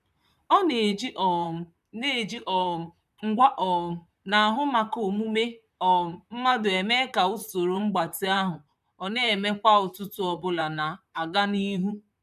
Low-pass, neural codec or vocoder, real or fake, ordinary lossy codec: 14.4 kHz; vocoder, 44.1 kHz, 128 mel bands, Pupu-Vocoder; fake; none